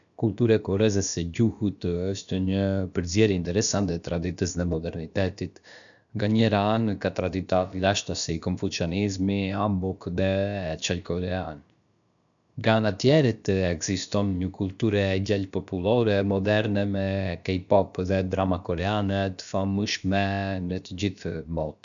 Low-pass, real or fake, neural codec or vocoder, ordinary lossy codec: 7.2 kHz; fake; codec, 16 kHz, about 1 kbps, DyCAST, with the encoder's durations; none